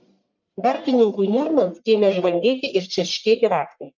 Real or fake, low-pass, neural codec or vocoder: fake; 7.2 kHz; codec, 44.1 kHz, 1.7 kbps, Pupu-Codec